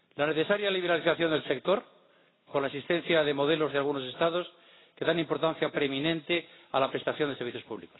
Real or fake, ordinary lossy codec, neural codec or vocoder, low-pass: real; AAC, 16 kbps; none; 7.2 kHz